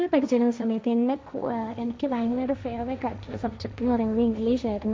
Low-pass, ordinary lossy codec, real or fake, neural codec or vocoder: none; none; fake; codec, 16 kHz, 1.1 kbps, Voila-Tokenizer